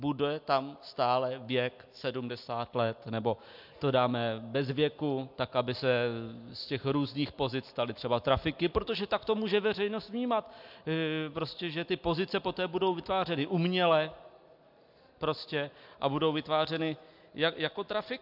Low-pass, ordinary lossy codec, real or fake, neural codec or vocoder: 5.4 kHz; MP3, 48 kbps; real; none